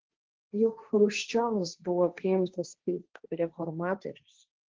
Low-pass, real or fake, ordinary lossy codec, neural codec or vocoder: 7.2 kHz; fake; Opus, 16 kbps; codec, 16 kHz, 1.1 kbps, Voila-Tokenizer